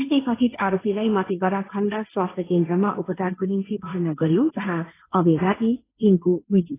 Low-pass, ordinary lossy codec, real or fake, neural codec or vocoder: 3.6 kHz; AAC, 16 kbps; fake; codec, 16 kHz, 1.1 kbps, Voila-Tokenizer